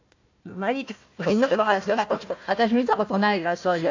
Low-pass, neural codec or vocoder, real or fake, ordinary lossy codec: 7.2 kHz; codec, 16 kHz, 1 kbps, FunCodec, trained on Chinese and English, 50 frames a second; fake; AAC, 48 kbps